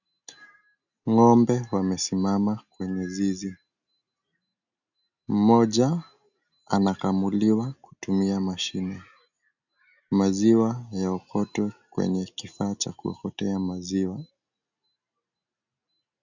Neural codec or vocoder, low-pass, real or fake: none; 7.2 kHz; real